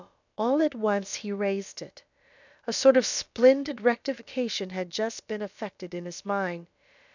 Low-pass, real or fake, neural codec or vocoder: 7.2 kHz; fake; codec, 16 kHz, about 1 kbps, DyCAST, with the encoder's durations